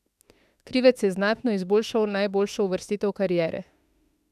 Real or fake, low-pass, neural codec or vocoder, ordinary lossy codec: fake; 14.4 kHz; autoencoder, 48 kHz, 32 numbers a frame, DAC-VAE, trained on Japanese speech; none